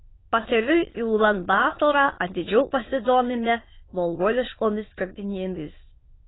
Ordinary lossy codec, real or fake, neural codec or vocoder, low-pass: AAC, 16 kbps; fake; autoencoder, 22.05 kHz, a latent of 192 numbers a frame, VITS, trained on many speakers; 7.2 kHz